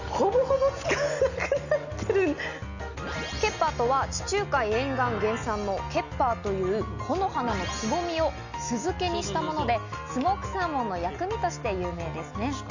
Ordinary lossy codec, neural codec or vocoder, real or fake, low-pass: none; none; real; 7.2 kHz